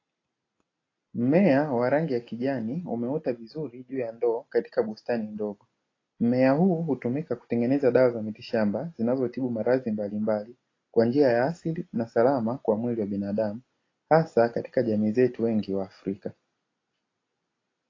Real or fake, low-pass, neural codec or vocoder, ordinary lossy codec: real; 7.2 kHz; none; AAC, 32 kbps